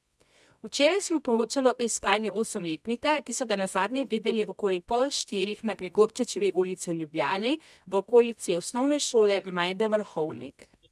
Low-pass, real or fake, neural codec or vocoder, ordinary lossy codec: none; fake; codec, 24 kHz, 0.9 kbps, WavTokenizer, medium music audio release; none